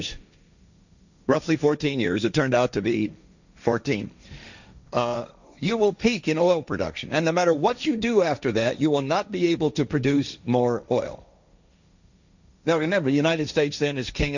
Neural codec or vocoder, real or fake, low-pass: codec, 16 kHz, 1.1 kbps, Voila-Tokenizer; fake; 7.2 kHz